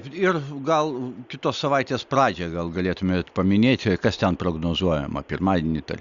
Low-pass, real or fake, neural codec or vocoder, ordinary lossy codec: 7.2 kHz; real; none; Opus, 64 kbps